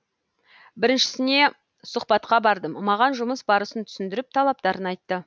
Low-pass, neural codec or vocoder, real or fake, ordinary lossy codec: none; none; real; none